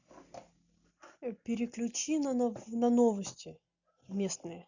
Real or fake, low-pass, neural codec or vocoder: real; 7.2 kHz; none